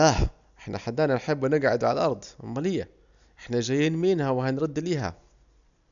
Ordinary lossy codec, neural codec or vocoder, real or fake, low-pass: none; none; real; 7.2 kHz